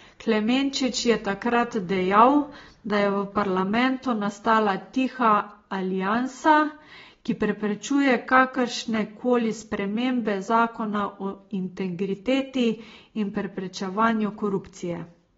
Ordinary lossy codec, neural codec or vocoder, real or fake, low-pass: AAC, 24 kbps; none; real; 7.2 kHz